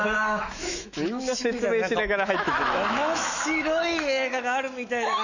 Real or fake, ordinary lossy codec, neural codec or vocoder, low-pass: fake; none; autoencoder, 48 kHz, 128 numbers a frame, DAC-VAE, trained on Japanese speech; 7.2 kHz